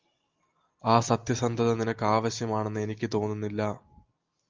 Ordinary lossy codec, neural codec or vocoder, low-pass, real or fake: Opus, 32 kbps; none; 7.2 kHz; real